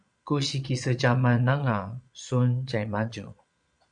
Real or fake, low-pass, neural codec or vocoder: fake; 9.9 kHz; vocoder, 22.05 kHz, 80 mel bands, Vocos